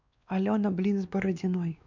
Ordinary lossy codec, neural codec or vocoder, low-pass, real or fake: none; codec, 16 kHz, 2 kbps, X-Codec, WavLM features, trained on Multilingual LibriSpeech; 7.2 kHz; fake